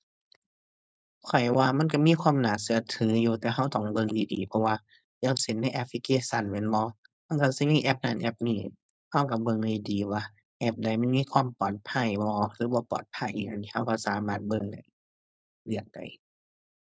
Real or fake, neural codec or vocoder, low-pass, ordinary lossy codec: fake; codec, 16 kHz, 4.8 kbps, FACodec; none; none